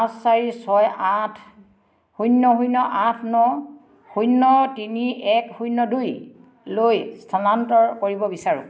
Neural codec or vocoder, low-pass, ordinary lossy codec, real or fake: none; none; none; real